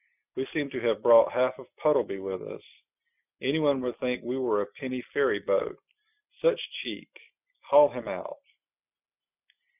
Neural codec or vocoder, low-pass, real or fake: none; 3.6 kHz; real